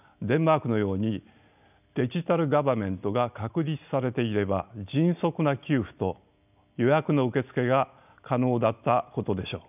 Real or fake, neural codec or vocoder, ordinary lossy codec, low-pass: real; none; none; 3.6 kHz